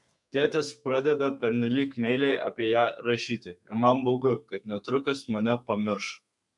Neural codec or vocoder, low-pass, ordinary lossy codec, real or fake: codec, 32 kHz, 1.9 kbps, SNAC; 10.8 kHz; MP3, 96 kbps; fake